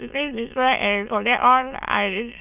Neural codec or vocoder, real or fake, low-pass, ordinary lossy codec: autoencoder, 22.05 kHz, a latent of 192 numbers a frame, VITS, trained on many speakers; fake; 3.6 kHz; none